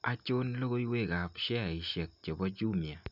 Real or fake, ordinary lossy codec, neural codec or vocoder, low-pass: real; none; none; 5.4 kHz